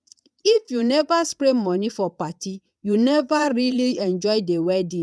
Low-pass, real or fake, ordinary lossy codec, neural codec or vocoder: none; fake; none; vocoder, 22.05 kHz, 80 mel bands, Vocos